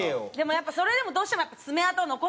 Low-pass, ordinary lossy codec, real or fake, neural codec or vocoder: none; none; real; none